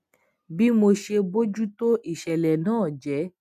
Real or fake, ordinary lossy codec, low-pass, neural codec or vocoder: real; none; 14.4 kHz; none